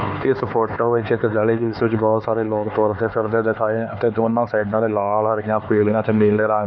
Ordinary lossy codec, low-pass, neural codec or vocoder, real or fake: none; none; codec, 16 kHz, 4 kbps, X-Codec, HuBERT features, trained on LibriSpeech; fake